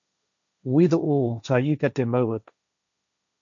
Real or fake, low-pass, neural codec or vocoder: fake; 7.2 kHz; codec, 16 kHz, 1.1 kbps, Voila-Tokenizer